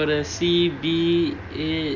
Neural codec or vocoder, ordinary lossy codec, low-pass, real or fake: none; none; 7.2 kHz; real